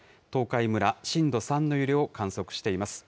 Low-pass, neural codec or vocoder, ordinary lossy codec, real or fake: none; none; none; real